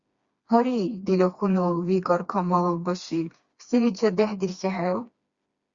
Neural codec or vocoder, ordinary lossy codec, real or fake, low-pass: codec, 16 kHz, 2 kbps, FreqCodec, smaller model; Opus, 64 kbps; fake; 7.2 kHz